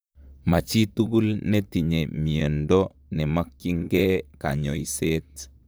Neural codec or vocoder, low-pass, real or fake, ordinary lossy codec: vocoder, 44.1 kHz, 128 mel bands, Pupu-Vocoder; none; fake; none